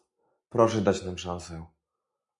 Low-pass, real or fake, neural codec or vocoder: 10.8 kHz; real; none